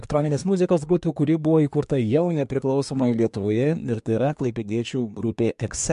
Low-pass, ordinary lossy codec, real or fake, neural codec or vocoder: 10.8 kHz; MP3, 64 kbps; fake; codec, 24 kHz, 1 kbps, SNAC